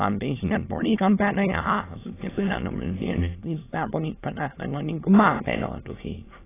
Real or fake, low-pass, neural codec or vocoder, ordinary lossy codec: fake; 3.6 kHz; autoencoder, 22.05 kHz, a latent of 192 numbers a frame, VITS, trained on many speakers; AAC, 16 kbps